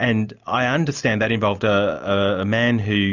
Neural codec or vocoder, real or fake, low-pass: none; real; 7.2 kHz